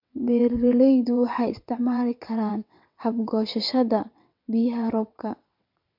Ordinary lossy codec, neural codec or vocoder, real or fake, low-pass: MP3, 48 kbps; vocoder, 22.05 kHz, 80 mel bands, WaveNeXt; fake; 5.4 kHz